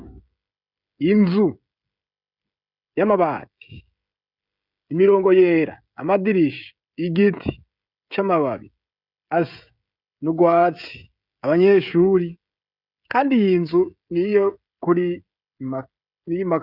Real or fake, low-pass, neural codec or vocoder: fake; 5.4 kHz; codec, 16 kHz, 16 kbps, FreqCodec, smaller model